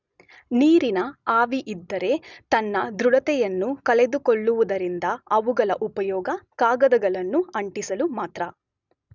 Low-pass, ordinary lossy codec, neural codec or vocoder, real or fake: 7.2 kHz; none; none; real